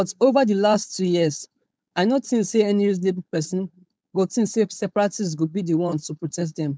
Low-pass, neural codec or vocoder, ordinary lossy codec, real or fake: none; codec, 16 kHz, 4.8 kbps, FACodec; none; fake